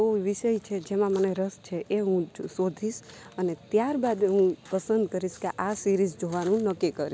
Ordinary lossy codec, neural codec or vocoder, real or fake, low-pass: none; none; real; none